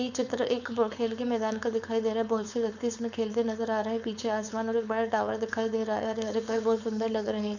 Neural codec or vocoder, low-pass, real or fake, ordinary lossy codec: codec, 16 kHz, 4.8 kbps, FACodec; 7.2 kHz; fake; none